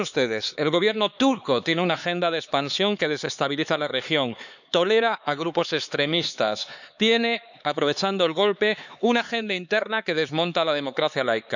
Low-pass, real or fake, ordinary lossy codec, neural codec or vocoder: 7.2 kHz; fake; none; codec, 16 kHz, 4 kbps, X-Codec, HuBERT features, trained on LibriSpeech